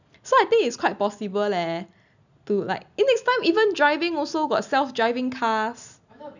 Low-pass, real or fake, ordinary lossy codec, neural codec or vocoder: 7.2 kHz; real; none; none